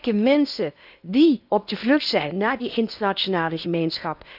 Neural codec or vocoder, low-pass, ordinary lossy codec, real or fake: codec, 16 kHz in and 24 kHz out, 0.8 kbps, FocalCodec, streaming, 65536 codes; 5.4 kHz; none; fake